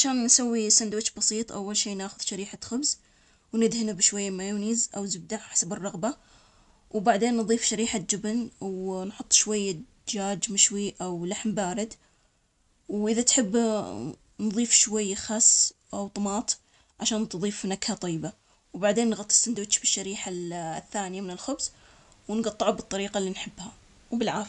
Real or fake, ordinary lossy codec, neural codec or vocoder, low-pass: real; none; none; 10.8 kHz